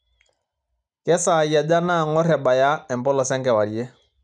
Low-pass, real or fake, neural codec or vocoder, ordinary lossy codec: 10.8 kHz; real; none; none